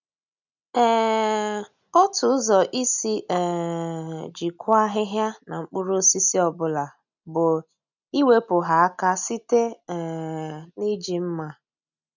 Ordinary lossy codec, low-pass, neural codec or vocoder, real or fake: none; 7.2 kHz; none; real